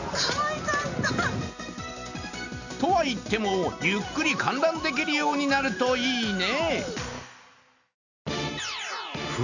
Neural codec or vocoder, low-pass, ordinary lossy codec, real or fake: none; 7.2 kHz; none; real